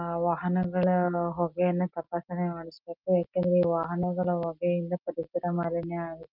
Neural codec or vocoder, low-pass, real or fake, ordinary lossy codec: none; 5.4 kHz; real; Opus, 24 kbps